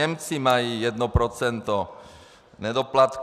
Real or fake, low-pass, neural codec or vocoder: real; 14.4 kHz; none